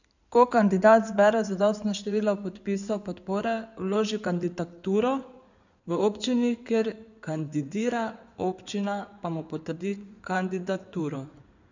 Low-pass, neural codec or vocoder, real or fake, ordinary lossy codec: 7.2 kHz; codec, 16 kHz in and 24 kHz out, 2.2 kbps, FireRedTTS-2 codec; fake; none